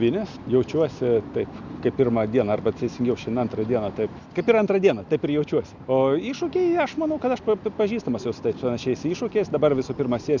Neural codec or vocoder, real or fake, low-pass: none; real; 7.2 kHz